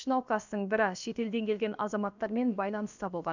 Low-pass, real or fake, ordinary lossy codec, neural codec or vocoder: 7.2 kHz; fake; none; codec, 16 kHz, about 1 kbps, DyCAST, with the encoder's durations